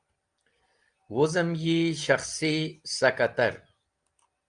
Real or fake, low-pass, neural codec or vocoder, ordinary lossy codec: real; 9.9 kHz; none; Opus, 32 kbps